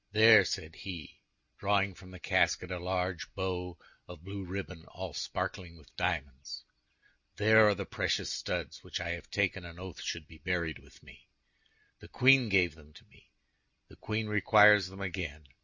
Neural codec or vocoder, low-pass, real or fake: none; 7.2 kHz; real